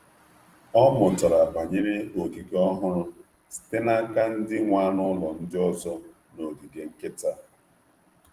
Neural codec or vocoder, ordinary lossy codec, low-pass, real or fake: vocoder, 44.1 kHz, 128 mel bands every 256 samples, BigVGAN v2; Opus, 24 kbps; 14.4 kHz; fake